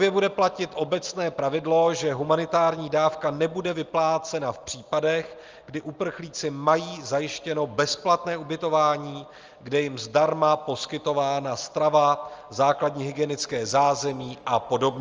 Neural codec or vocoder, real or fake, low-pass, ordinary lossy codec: none; real; 7.2 kHz; Opus, 24 kbps